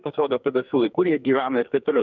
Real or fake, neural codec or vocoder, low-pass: fake; codec, 44.1 kHz, 2.6 kbps, SNAC; 7.2 kHz